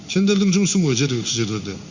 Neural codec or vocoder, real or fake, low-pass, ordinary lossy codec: codec, 16 kHz in and 24 kHz out, 1 kbps, XY-Tokenizer; fake; 7.2 kHz; Opus, 64 kbps